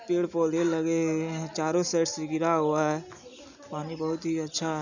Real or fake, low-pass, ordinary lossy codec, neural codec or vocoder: real; 7.2 kHz; none; none